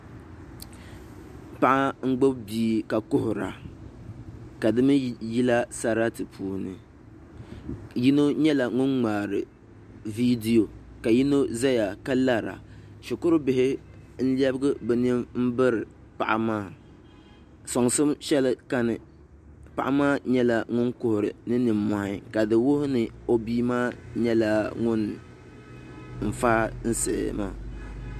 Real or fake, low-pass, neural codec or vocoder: real; 14.4 kHz; none